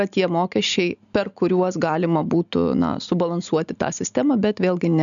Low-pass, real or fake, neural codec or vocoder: 7.2 kHz; real; none